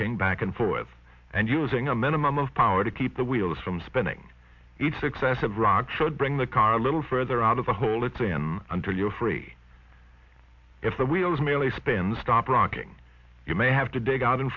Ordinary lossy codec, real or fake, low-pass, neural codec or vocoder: MP3, 64 kbps; real; 7.2 kHz; none